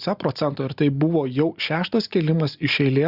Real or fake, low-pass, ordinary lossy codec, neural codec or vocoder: real; 5.4 kHz; Opus, 64 kbps; none